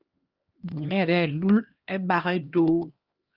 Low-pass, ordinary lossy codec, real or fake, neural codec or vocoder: 5.4 kHz; Opus, 24 kbps; fake; codec, 16 kHz, 1 kbps, X-Codec, HuBERT features, trained on LibriSpeech